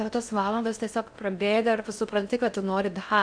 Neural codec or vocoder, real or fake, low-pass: codec, 16 kHz in and 24 kHz out, 0.6 kbps, FocalCodec, streaming, 4096 codes; fake; 9.9 kHz